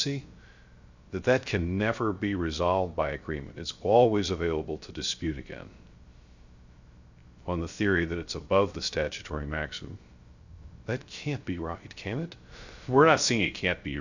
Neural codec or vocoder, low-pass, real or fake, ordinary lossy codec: codec, 16 kHz, 0.3 kbps, FocalCodec; 7.2 kHz; fake; Opus, 64 kbps